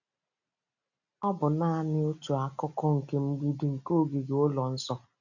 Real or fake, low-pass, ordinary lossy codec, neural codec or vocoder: real; 7.2 kHz; none; none